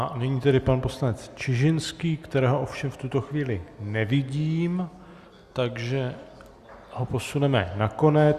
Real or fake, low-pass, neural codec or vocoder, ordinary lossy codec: real; 14.4 kHz; none; Opus, 64 kbps